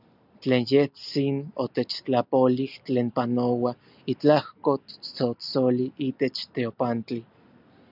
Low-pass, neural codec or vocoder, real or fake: 5.4 kHz; none; real